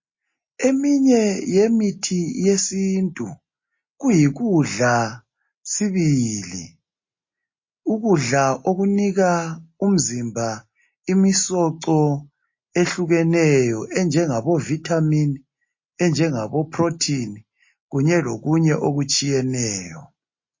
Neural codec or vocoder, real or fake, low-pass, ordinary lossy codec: none; real; 7.2 kHz; MP3, 32 kbps